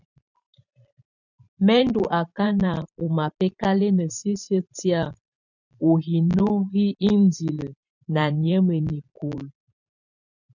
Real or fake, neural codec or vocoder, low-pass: fake; vocoder, 44.1 kHz, 128 mel bands every 512 samples, BigVGAN v2; 7.2 kHz